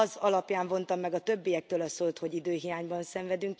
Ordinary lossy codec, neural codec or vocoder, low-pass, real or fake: none; none; none; real